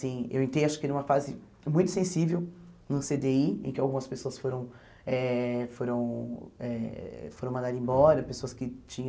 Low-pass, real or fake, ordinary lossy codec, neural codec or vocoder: none; real; none; none